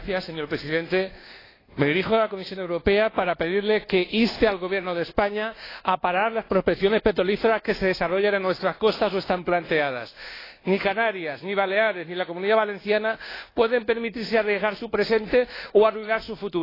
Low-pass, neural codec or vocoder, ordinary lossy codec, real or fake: 5.4 kHz; codec, 24 kHz, 1.2 kbps, DualCodec; AAC, 24 kbps; fake